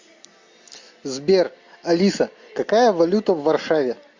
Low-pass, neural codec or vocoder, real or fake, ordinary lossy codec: 7.2 kHz; none; real; MP3, 48 kbps